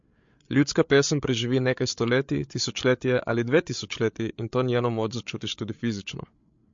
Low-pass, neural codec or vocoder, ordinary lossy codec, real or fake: 7.2 kHz; codec, 16 kHz, 8 kbps, FreqCodec, larger model; MP3, 48 kbps; fake